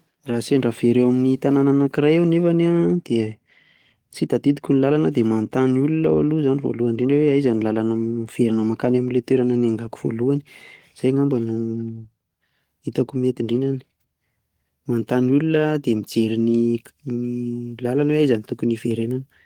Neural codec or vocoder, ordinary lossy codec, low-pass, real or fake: codec, 44.1 kHz, 7.8 kbps, DAC; Opus, 24 kbps; 19.8 kHz; fake